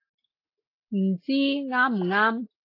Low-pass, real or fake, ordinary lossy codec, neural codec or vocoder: 5.4 kHz; real; AAC, 32 kbps; none